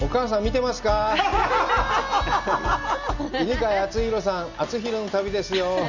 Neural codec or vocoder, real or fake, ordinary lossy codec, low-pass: none; real; none; 7.2 kHz